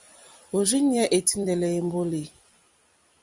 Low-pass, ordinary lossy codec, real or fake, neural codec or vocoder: 10.8 kHz; Opus, 64 kbps; fake; vocoder, 44.1 kHz, 128 mel bands every 256 samples, BigVGAN v2